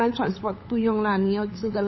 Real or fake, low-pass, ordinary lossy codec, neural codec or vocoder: fake; 7.2 kHz; MP3, 24 kbps; codec, 16 kHz, 8 kbps, FunCodec, trained on LibriTTS, 25 frames a second